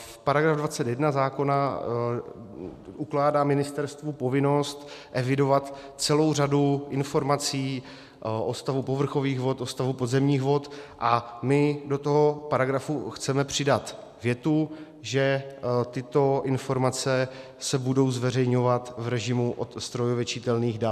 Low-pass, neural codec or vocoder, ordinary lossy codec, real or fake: 14.4 kHz; none; MP3, 96 kbps; real